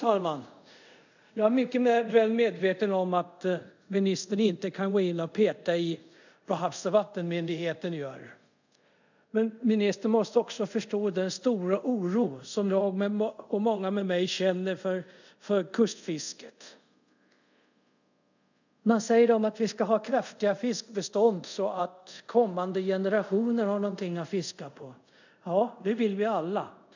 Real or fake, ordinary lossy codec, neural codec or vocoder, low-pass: fake; none; codec, 24 kHz, 0.5 kbps, DualCodec; 7.2 kHz